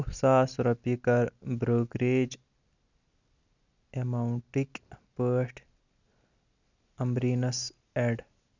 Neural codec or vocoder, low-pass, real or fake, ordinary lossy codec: none; 7.2 kHz; real; none